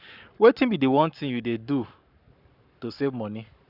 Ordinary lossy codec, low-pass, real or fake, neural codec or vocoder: none; 5.4 kHz; fake; codec, 44.1 kHz, 7.8 kbps, Pupu-Codec